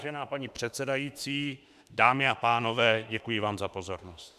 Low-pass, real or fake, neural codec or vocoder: 14.4 kHz; fake; autoencoder, 48 kHz, 32 numbers a frame, DAC-VAE, trained on Japanese speech